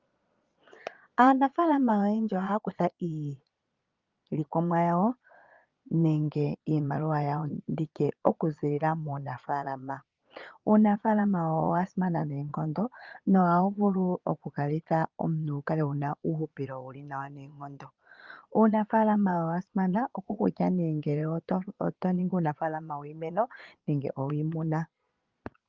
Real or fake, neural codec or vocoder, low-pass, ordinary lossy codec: fake; vocoder, 22.05 kHz, 80 mel bands, Vocos; 7.2 kHz; Opus, 32 kbps